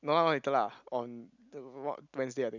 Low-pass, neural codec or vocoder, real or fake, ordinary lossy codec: 7.2 kHz; none; real; none